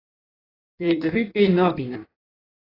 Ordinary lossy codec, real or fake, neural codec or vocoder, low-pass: AAC, 24 kbps; fake; codec, 16 kHz in and 24 kHz out, 1.1 kbps, FireRedTTS-2 codec; 5.4 kHz